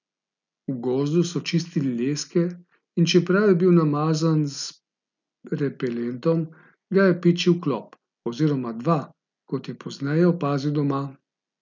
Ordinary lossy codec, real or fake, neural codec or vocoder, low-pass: none; real; none; 7.2 kHz